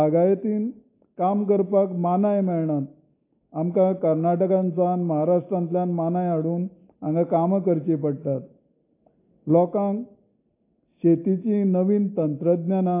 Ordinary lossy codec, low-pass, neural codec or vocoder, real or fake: AAC, 24 kbps; 3.6 kHz; none; real